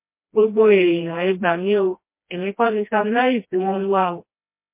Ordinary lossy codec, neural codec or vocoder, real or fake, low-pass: MP3, 24 kbps; codec, 16 kHz, 1 kbps, FreqCodec, smaller model; fake; 3.6 kHz